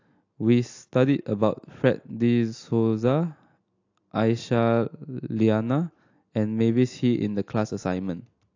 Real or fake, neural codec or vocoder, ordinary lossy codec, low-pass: real; none; AAC, 48 kbps; 7.2 kHz